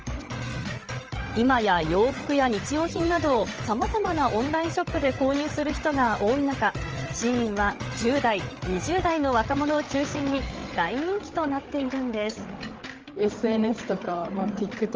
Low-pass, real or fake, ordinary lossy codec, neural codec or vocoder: 7.2 kHz; fake; Opus, 24 kbps; codec, 16 kHz, 8 kbps, FreqCodec, larger model